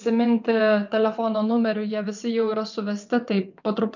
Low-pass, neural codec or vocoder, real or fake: 7.2 kHz; vocoder, 22.05 kHz, 80 mel bands, WaveNeXt; fake